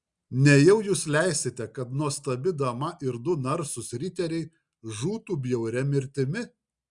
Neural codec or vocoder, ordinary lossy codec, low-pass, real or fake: none; Opus, 64 kbps; 10.8 kHz; real